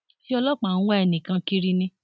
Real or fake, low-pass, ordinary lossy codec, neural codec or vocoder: real; none; none; none